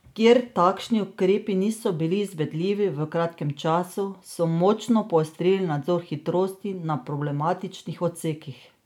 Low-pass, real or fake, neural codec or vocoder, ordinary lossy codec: 19.8 kHz; real; none; none